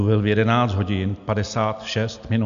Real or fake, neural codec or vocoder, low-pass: real; none; 7.2 kHz